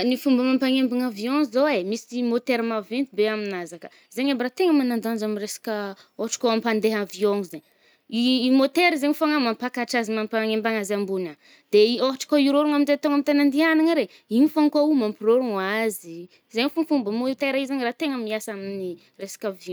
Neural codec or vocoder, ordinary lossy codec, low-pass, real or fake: none; none; none; real